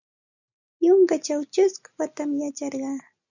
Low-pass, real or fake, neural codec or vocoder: 7.2 kHz; real; none